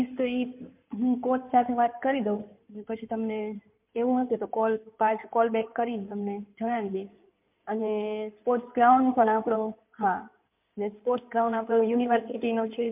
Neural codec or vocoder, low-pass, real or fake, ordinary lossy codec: codec, 16 kHz in and 24 kHz out, 2.2 kbps, FireRedTTS-2 codec; 3.6 kHz; fake; MP3, 32 kbps